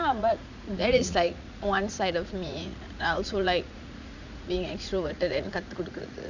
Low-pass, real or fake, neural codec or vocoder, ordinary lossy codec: 7.2 kHz; fake; vocoder, 44.1 kHz, 80 mel bands, Vocos; none